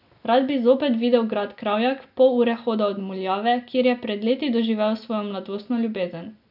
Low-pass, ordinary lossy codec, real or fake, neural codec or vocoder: 5.4 kHz; none; real; none